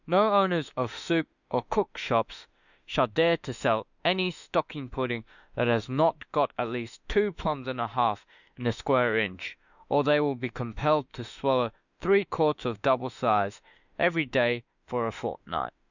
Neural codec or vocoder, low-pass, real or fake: autoencoder, 48 kHz, 32 numbers a frame, DAC-VAE, trained on Japanese speech; 7.2 kHz; fake